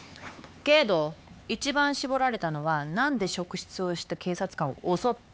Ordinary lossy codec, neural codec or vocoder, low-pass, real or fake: none; codec, 16 kHz, 4 kbps, X-Codec, HuBERT features, trained on LibriSpeech; none; fake